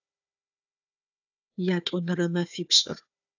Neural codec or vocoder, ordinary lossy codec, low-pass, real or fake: codec, 16 kHz, 4 kbps, FunCodec, trained on Chinese and English, 50 frames a second; AAC, 48 kbps; 7.2 kHz; fake